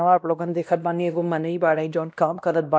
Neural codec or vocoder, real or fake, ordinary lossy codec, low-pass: codec, 16 kHz, 1 kbps, X-Codec, WavLM features, trained on Multilingual LibriSpeech; fake; none; none